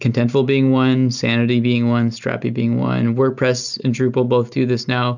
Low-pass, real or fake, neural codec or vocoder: 7.2 kHz; real; none